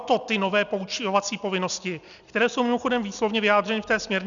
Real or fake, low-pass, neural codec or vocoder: real; 7.2 kHz; none